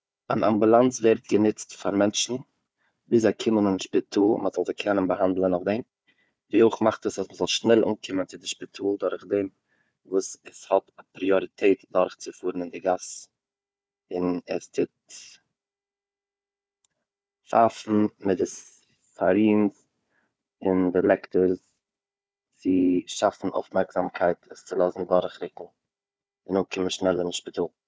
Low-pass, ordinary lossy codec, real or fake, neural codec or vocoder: none; none; fake; codec, 16 kHz, 4 kbps, FunCodec, trained on Chinese and English, 50 frames a second